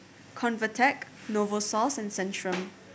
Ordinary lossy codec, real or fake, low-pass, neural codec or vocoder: none; real; none; none